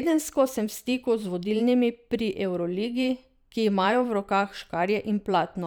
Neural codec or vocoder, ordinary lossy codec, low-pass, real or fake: vocoder, 44.1 kHz, 128 mel bands every 512 samples, BigVGAN v2; none; none; fake